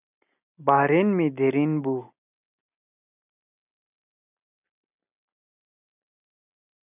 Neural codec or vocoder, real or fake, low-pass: none; real; 3.6 kHz